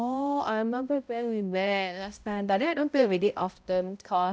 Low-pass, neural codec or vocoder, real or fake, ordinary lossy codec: none; codec, 16 kHz, 0.5 kbps, X-Codec, HuBERT features, trained on balanced general audio; fake; none